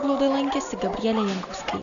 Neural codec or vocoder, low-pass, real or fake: none; 7.2 kHz; real